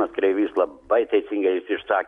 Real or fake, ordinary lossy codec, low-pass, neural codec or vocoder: real; MP3, 64 kbps; 10.8 kHz; none